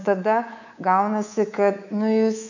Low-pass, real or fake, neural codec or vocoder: 7.2 kHz; fake; codec, 24 kHz, 3.1 kbps, DualCodec